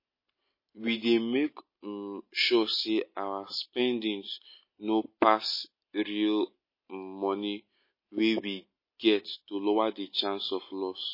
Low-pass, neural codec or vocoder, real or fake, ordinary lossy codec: 5.4 kHz; none; real; MP3, 24 kbps